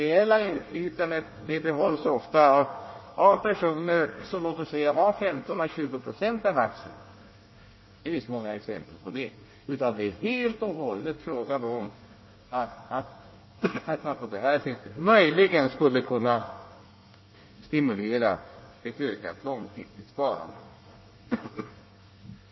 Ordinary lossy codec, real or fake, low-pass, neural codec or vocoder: MP3, 24 kbps; fake; 7.2 kHz; codec, 24 kHz, 1 kbps, SNAC